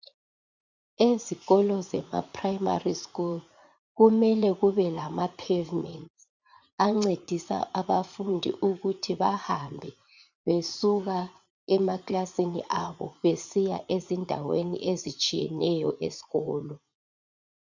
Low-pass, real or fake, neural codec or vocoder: 7.2 kHz; fake; vocoder, 44.1 kHz, 80 mel bands, Vocos